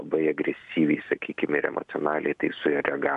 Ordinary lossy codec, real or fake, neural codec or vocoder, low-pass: AAC, 96 kbps; real; none; 10.8 kHz